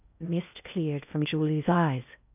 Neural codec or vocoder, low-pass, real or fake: codec, 16 kHz in and 24 kHz out, 0.6 kbps, FocalCodec, streaming, 2048 codes; 3.6 kHz; fake